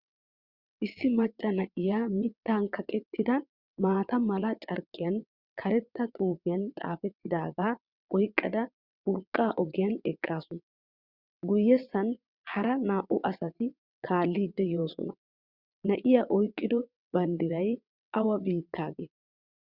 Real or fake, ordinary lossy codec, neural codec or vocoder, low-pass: fake; Opus, 64 kbps; vocoder, 22.05 kHz, 80 mel bands, WaveNeXt; 5.4 kHz